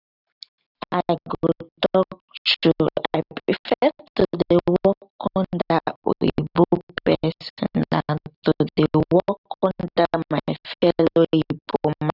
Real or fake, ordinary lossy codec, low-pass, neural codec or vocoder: real; none; 5.4 kHz; none